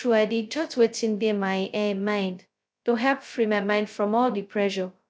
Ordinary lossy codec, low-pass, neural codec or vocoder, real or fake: none; none; codec, 16 kHz, 0.2 kbps, FocalCodec; fake